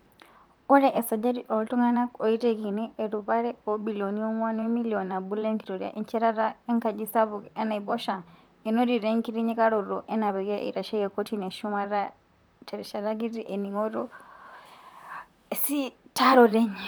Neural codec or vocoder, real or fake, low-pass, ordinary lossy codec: vocoder, 44.1 kHz, 128 mel bands, Pupu-Vocoder; fake; none; none